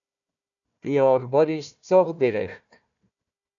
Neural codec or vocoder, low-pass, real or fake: codec, 16 kHz, 1 kbps, FunCodec, trained on Chinese and English, 50 frames a second; 7.2 kHz; fake